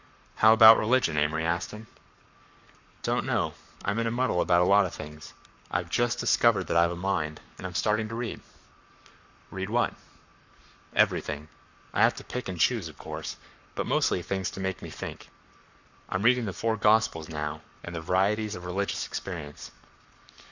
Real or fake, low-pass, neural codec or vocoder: fake; 7.2 kHz; codec, 44.1 kHz, 7.8 kbps, Pupu-Codec